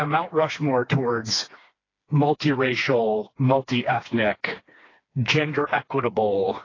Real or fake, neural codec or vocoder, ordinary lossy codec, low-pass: fake; codec, 16 kHz, 2 kbps, FreqCodec, smaller model; AAC, 32 kbps; 7.2 kHz